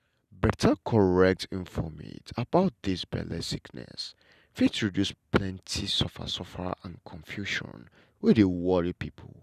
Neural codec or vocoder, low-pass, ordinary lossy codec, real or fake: none; 14.4 kHz; none; real